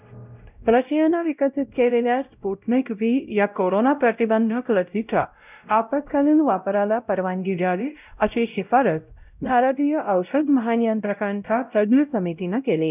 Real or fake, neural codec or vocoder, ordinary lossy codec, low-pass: fake; codec, 16 kHz, 0.5 kbps, X-Codec, WavLM features, trained on Multilingual LibriSpeech; none; 3.6 kHz